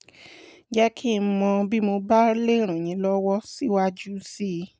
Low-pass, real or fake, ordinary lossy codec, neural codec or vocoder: none; real; none; none